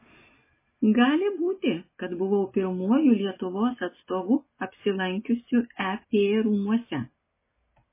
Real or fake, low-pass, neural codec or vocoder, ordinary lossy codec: real; 3.6 kHz; none; MP3, 16 kbps